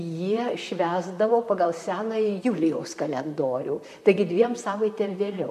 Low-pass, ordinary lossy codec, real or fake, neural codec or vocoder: 14.4 kHz; MP3, 64 kbps; fake; vocoder, 44.1 kHz, 128 mel bands, Pupu-Vocoder